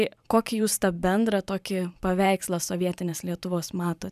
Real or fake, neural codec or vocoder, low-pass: fake; vocoder, 44.1 kHz, 128 mel bands every 512 samples, BigVGAN v2; 14.4 kHz